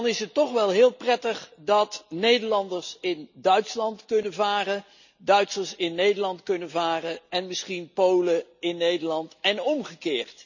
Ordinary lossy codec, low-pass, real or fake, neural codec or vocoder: none; 7.2 kHz; real; none